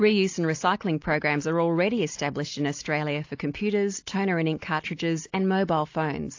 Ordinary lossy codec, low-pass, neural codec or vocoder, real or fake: AAC, 48 kbps; 7.2 kHz; none; real